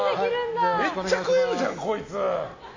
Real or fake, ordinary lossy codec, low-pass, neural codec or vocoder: real; AAC, 32 kbps; 7.2 kHz; none